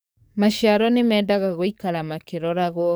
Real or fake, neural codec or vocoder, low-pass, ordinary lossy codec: fake; codec, 44.1 kHz, 7.8 kbps, DAC; none; none